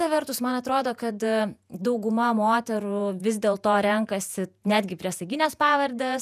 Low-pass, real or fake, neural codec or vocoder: 14.4 kHz; fake; vocoder, 48 kHz, 128 mel bands, Vocos